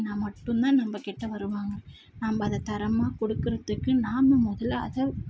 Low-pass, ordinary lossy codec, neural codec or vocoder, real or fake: none; none; none; real